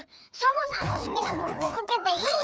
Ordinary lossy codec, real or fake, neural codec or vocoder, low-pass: none; fake; codec, 16 kHz, 2 kbps, FreqCodec, larger model; none